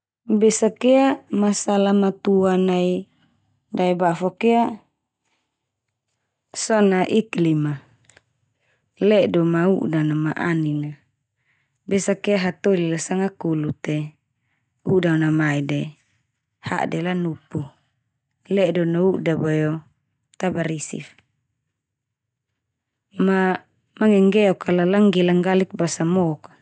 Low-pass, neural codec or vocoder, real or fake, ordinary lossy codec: none; none; real; none